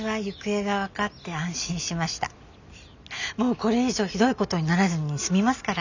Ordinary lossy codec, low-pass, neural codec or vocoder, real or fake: none; 7.2 kHz; none; real